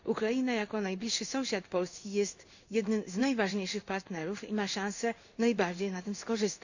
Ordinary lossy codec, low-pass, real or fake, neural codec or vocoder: none; 7.2 kHz; fake; codec, 16 kHz in and 24 kHz out, 1 kbps, XY-Tokenizer